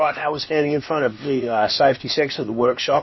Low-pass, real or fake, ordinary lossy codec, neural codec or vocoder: 7.2 kHz; fake; MP3, 24 kbps; codec, 16 kHz, about 1 kbps, DyCAST, with the encoder's durations